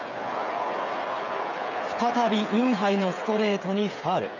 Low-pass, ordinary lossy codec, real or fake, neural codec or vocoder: 7.2 kHz; none; fake; codec, 16 kHz, 8 kbps, FreqCodec, smaller model